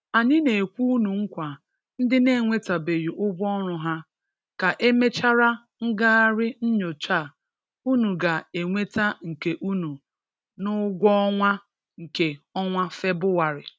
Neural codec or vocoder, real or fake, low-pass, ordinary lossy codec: none; real; none; none